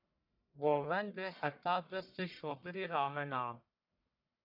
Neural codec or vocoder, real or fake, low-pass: codec, 44.1 kHz, 1.7 kbps, Pupu-Codec; fake; 5.4 kHz